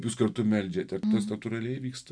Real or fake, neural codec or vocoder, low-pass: real; none; 9.9 kHz